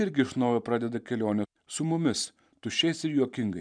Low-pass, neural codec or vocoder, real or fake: 9.9 kHz; vocoder, 44.1 kHz, 128 mel bands every 512 samples, BigVGAN v2; fake